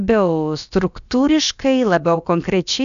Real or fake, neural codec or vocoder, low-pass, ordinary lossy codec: fake; codec, 16 kHz, about 1 kbps, DyCAST, with the encoder's durations; 7.2 kHz; AAC, 96 kbps